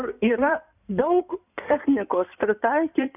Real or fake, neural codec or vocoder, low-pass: fake; codec, 16 kHz in and 24 kHz out, 2.2 kbps, FireRedTTS-2 codec; 3.6 kHz